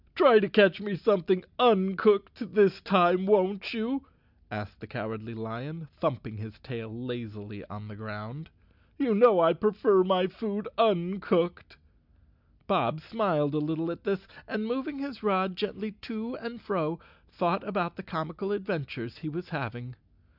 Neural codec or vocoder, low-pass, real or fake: none; 5.4 kHz; real